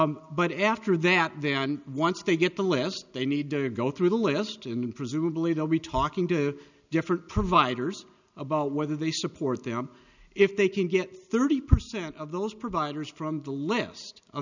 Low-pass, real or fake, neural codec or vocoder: 7.2 kHz; real; none